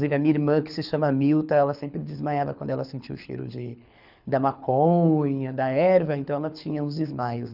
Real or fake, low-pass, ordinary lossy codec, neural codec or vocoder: fake; 5.4 kHz; none; codec, 24 kHz, 6 kbps, HILCodec